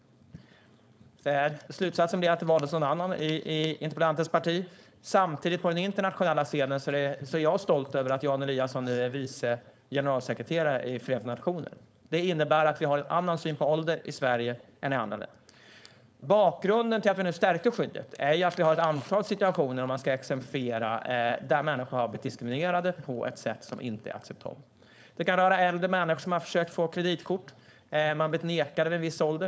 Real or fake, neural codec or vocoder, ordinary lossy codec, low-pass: fake; codec, 16 kHz, 4.8 kbps, FACodec; none; none